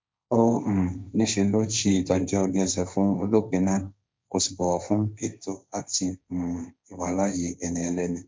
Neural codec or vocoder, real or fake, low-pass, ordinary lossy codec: codec, 16 kHz, 1.1 kbps, Voila-Tokenizer; fake; none; none